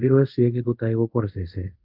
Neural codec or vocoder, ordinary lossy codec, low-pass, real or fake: codec, 24 kHz, 0.5 kbps, DualCodec; Opus, 32 kbps; 5.4 kHz; fake